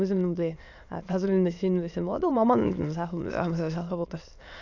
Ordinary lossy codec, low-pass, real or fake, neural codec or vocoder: none; 7.2 kHz; fake; autoencoder, 22.05 kHz, a latent of 192 numbers a frame, VITS, trained on many speakers